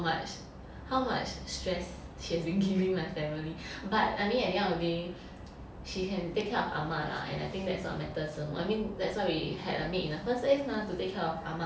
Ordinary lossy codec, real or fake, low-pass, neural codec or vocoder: none; real; none; none